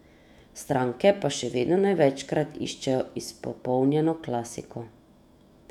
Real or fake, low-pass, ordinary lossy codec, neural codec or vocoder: fake; 19.8 kHz; none; autoencoder, 48 kHz, 128 numbers a frame, DAC-VAE, trained on Japanese speech